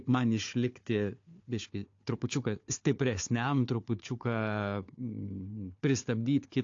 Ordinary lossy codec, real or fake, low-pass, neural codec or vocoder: AAC, 48 kbps; fake; 7.2 kHz; codec, 16 kHz, 4 kbps, FunCodec, trained on Chinese and English, 50 frames a second